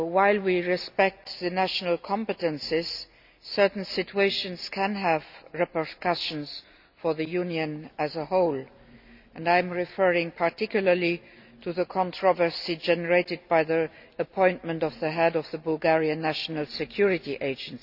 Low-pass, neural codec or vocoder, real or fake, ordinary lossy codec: 5.4 kHz; none; real; none